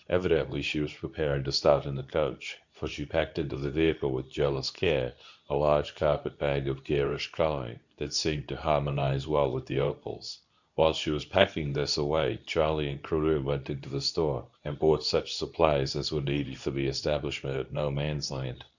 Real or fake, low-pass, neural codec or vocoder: fake; 7.2 kHz; codec, 24 kHz, 0.9 kbps, WavTokenizer, medium speech release version 2